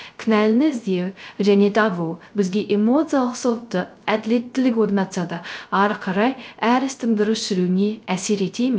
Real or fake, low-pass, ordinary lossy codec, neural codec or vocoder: fake; none; none; codec, 16 kHz, 0.3 kbps, FocalCodec